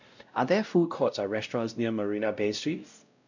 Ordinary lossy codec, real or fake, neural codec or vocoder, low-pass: none; fake; codec, 16 kHz, 0.5 kbps, X-Codec, WavLM features, trained on Multilingual LibriSpeech; 7.2 kHz